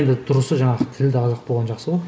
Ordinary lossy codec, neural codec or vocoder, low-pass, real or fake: none; none; none; real